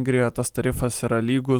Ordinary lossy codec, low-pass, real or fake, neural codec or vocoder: Opus, 24 kbps; 19.8 kHz; real; none